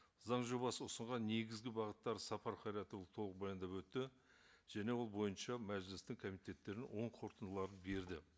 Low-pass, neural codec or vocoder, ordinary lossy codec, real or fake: none; none; none; real